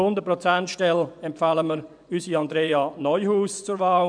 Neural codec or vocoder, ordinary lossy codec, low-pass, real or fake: vocoder, 24 kHz, 100 mel bands, Vocos; none; 9.9 kHz; fake